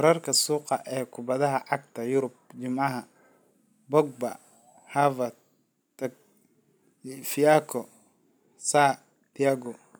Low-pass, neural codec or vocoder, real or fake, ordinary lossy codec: none; none; real; none